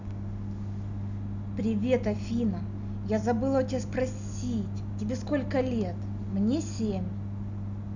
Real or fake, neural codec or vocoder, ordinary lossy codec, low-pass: real; none; none; 7.2 kHz